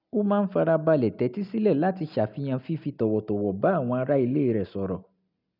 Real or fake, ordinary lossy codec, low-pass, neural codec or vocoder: real; none; 5.4 kHz; none